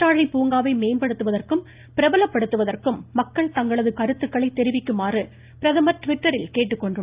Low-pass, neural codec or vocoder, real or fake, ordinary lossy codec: 3.6 kHz; none; real; Opus, 32 kbps